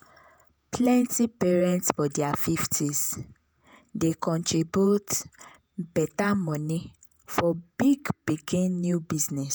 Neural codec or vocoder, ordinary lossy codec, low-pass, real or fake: vocoder, 48 kHz, 128 mel bands, Vocos; none; none; fake